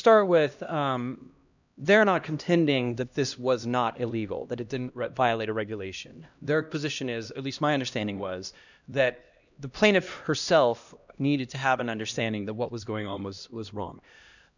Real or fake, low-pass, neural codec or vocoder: fake; 7.2 kHz; codec, 16 kHz, 1 kbps, X-Codec, HuBERT features, trained on LibriSpeech